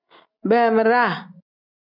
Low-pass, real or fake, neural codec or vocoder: 5.4 kHz; real; none